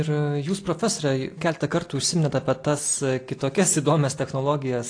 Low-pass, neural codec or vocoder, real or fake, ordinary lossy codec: 9.9 kHz; none; real; AAC, 48 kbps